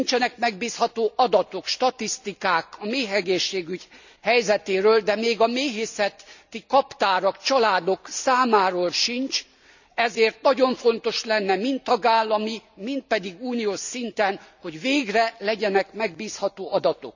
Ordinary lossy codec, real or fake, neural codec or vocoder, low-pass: none; real; none; 7.2 kHz